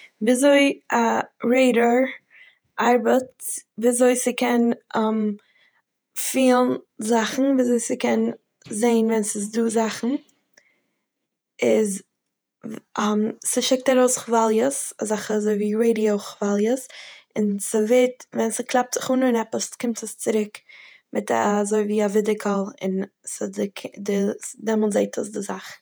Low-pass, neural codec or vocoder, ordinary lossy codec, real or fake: none; vocoder, 48 kHz, 128 mel bands, Vocos; none; fake